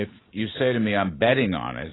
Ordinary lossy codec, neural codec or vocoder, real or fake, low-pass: AAC, 16 kbps; none; real; 7.2 kHz